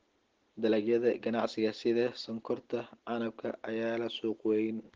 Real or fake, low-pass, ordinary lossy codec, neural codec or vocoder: real; 7.2 kHz; Opus, 16 kbps; none